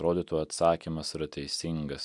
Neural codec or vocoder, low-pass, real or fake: none; 10.8 kHz; real